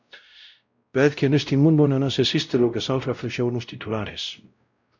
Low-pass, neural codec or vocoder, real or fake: 7.2 kHz; codec, 16 kHz, 0.5 kbps, X-Codec, WavLM features, trained on Multilingual LibriSpeech; fake